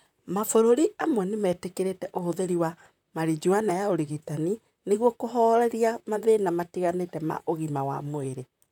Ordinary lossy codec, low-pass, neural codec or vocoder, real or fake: none; 19.8 kHz; vocoder, 44.1 kHz, 128 mel bands, Pupu-Vocoder; fake